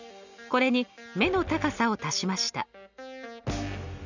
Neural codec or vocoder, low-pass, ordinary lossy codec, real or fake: none; 7.2 kHz; none; real